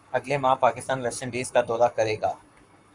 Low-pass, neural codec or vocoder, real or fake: 10.8 kHz; codec, 44.1 kHz, 7.8 kbps, Pupu-Codec; fake